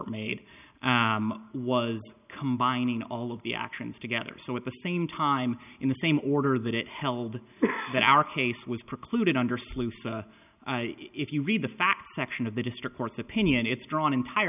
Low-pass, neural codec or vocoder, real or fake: 3.6 kHz; none; real